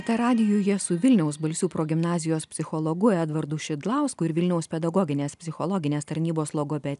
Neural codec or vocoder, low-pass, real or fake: none; 10.8 kHz; real